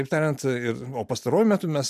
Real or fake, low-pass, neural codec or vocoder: real; 14.4 kHz; none